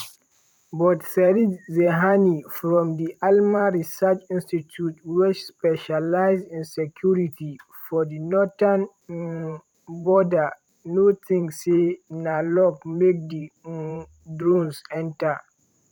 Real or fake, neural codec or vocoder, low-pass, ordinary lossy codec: fake; vocoder, 44.1 kHz, 128 mel bands every 512 samples, BigVGAN v2; 19.8 kHz; none